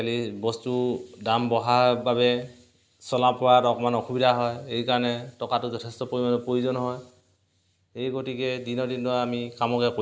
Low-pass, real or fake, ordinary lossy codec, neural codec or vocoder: none; real; none; none